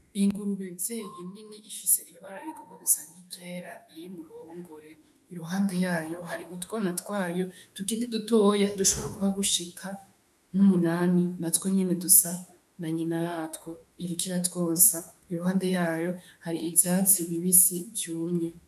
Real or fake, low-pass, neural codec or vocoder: fake; 14.4 kHz; autoencoder, 48 kHz, 32 numbers a frame, DAC-VAE, trained on Japanese speech